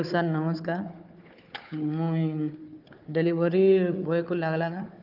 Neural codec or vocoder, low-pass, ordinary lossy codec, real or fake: codec, 16 kHz, 4 kbps, FunCodec, trained on Chinese and English, 50 frames a second; 5.4 kHz; Opus, 24 kbps; fake